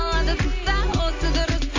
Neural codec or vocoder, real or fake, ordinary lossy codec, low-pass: none; real; AAC, 48 kbps; 7.2 kHz